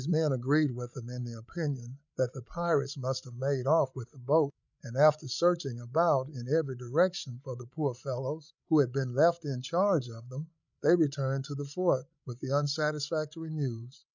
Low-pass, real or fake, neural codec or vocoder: 7.2 kHz; fake; codec, 16 kHz, 16 kbps, FreqCodec, larger model